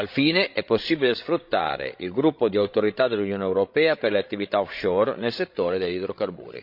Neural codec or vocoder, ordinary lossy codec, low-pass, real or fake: codec, 16 kHz, 16 kbps, FreqCodec, larger model; MP3, 48 kbps; 5.4 kHz; fake